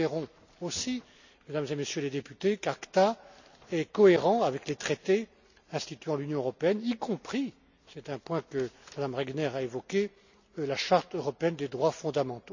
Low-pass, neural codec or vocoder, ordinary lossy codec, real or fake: 7.2 kHz; none; none; real